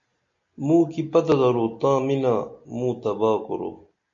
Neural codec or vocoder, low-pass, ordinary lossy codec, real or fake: none; 7.2 kHz; MP3, 48 kbps; real